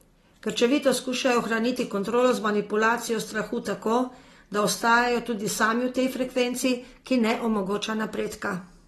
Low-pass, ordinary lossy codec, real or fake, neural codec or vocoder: 19.8 kHz; AAC, 32 kbps; real; none